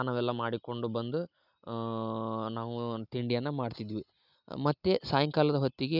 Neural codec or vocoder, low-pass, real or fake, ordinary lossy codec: none; 5.4 kHz; real; none